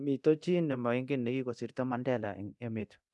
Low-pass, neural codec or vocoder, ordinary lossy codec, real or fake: none; codec, 24 kHz, 0.9 kbps, DualCodec; none; fake